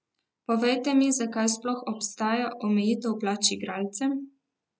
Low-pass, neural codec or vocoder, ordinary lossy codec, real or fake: none; none; none; real